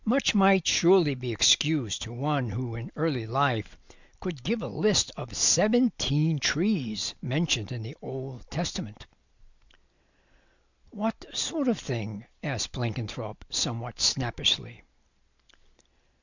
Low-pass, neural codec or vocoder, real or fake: 7.2 kHz; none; real